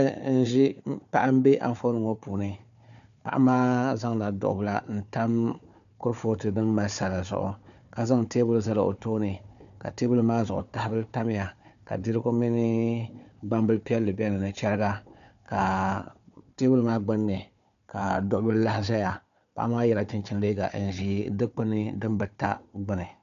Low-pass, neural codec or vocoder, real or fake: 7.2 kHz; codec, 16 kHz, 4 kbps, FunCodec, trained on Chinese and English, 50 frames a second; fake